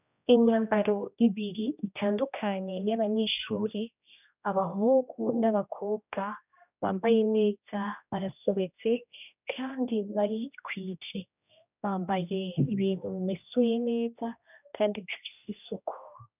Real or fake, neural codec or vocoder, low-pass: fake; codec, 16 kHz, 1 kbps, X-Codec, HuBERT features, trained on general audio; 3.6 kHz